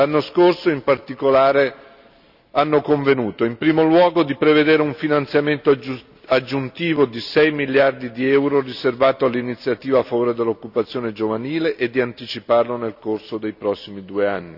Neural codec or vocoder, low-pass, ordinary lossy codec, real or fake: none; 5.4 kHz; none; real